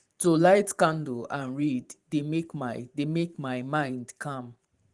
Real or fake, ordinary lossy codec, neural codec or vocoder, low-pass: fake; Opus, 24 kbps; vocoder, 44.1 kHz, 128 mel bands every 512 samples, BigVGAN v2; 10.8 kHz